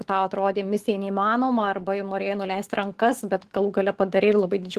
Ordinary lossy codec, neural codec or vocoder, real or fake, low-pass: Opus, 16 kbps; autoencoder, 48 kHz, 128 numbers a frame, DAC-VAE, trained on Japanese speech; fake; 14.4 kHz